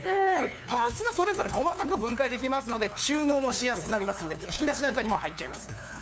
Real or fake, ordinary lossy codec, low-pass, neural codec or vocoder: fake; none; none; codec, 16 kHz, 2 kbps, FunCodec, trained on LibriTTS, 25 frames a second